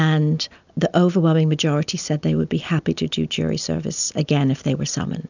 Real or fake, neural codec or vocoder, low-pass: real; none; 7.2 kHz